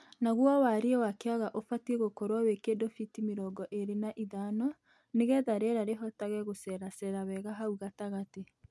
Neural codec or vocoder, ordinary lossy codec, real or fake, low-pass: none; none; real; none